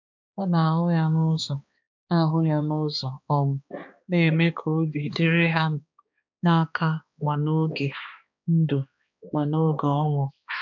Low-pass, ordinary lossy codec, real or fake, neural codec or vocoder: 7.2 kHz; MP3, 64 kbps; fake; codec, 16 kHz, 2 kbps, X-Codec, HuBERT features, trained on balanced general audio